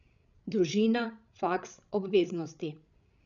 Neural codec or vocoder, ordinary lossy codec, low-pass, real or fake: codec, 16 kHz, 16 kbps, FreqCodec, larger model; none; 7.2 kHz; fake